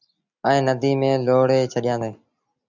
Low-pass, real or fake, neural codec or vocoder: 7.2 kHz; real; none